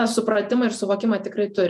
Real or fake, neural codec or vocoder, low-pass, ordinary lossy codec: fake; vocoder, 48 kHz, 128 mel bands, Vocos; 14.4 kHz; MP3, 96 kbps